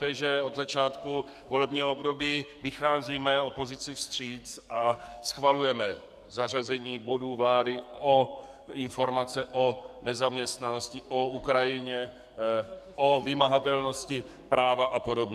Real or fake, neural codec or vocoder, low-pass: fake; codec, 44.1 kHz, 2.6 kbps, SNAC; 14.4 kHz